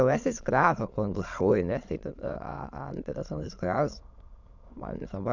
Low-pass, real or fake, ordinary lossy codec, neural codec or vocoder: 7.2 kHz; fake; none; autoencoder, 22.05 kHz, a latent of 192 numbers a frame, VITS, trained on many speakers